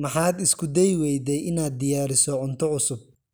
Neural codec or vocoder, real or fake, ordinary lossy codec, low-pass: none; real; none; none